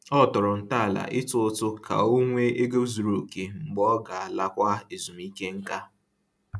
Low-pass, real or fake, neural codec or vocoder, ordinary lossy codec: none; real; none; none